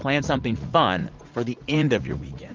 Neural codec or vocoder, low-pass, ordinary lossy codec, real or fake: vocoder, 44.1 kHz, 80 mel bands, Vocos; 7.2 kHz; Opus, 32 kbps; fake